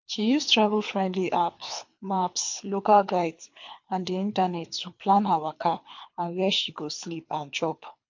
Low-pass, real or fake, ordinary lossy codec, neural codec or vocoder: 7.2 kHz; fake; MP3, 48 kbps; codec, 24 kHz, 3 kbps, HILCodec